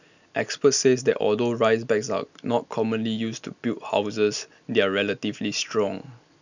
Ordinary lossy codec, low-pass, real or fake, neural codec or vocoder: none; 7.2 kHz; real; none